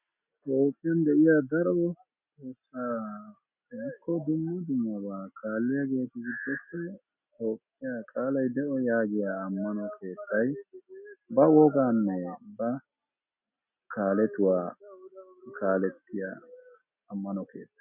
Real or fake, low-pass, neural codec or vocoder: real; 3.6 kHz; none